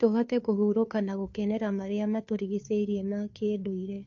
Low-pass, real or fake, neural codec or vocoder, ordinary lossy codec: 7.2 kHz; fake; codec, 16 kHz, 2 kbps, FunCodec, trained on Chinese and English, 25 frames a second; AAC, 48 kbps